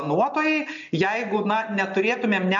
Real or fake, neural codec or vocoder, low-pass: real; none; 7.2 kHz